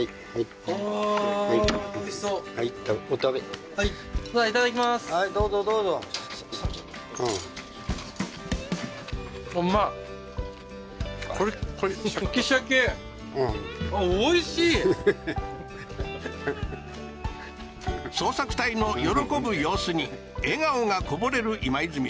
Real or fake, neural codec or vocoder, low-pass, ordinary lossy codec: real; none; none; none